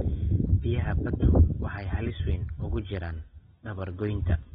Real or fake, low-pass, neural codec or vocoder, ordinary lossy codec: real; 7.2 kHz; none; AAC, 16 kbps